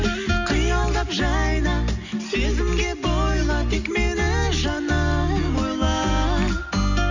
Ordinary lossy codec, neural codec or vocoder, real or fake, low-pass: none; none; real; 7.2 kHz